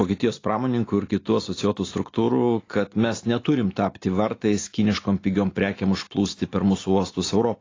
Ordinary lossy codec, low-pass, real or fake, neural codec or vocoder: AAC, 32 kbps; 7.2 kHz; real; none